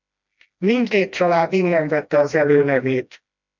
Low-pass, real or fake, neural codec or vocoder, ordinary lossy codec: 7.2 kHz; fake; codec, 16 kHz, 1 kbps, FreqCodec, smaller model; MP3, 64 kbps